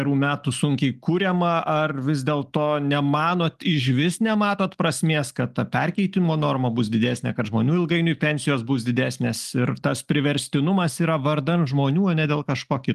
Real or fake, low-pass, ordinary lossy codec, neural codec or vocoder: real; 14.4 kHz; Opus, 24 kbps; none